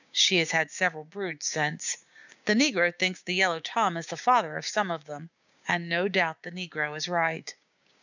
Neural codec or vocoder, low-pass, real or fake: codec, 16 kHz, 6 kbps, DAC; 7.2 kHz; fake